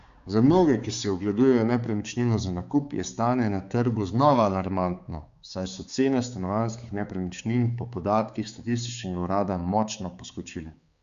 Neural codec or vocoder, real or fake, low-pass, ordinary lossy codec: codec, 16 kHz, 4 kbps, X-Codec, HuBERT features, trained on balanced general audio; fake; 7.2 kHz; Opus, 64 kbps